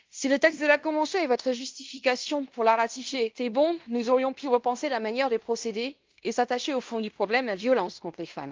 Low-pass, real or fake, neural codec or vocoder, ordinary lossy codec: 7.2 kHz; fake; codec, 16 kHz in and 24 kHz out, 0.9 kbps, LongCat-Audio-Codec, fine tuned four codebook decoder; Opus, 32 kbps